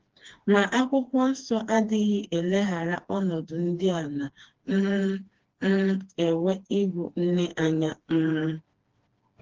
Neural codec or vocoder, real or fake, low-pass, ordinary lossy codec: codec, 16 kHz, 2 kbps, FreqCodec, smaller model; fake; 7.2 kHz; Opus, 16 kbps